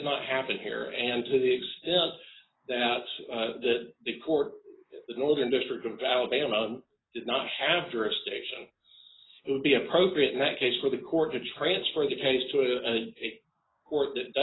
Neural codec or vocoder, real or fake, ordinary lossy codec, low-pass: none; real; AAC, 16 kbps; 7.2 kHz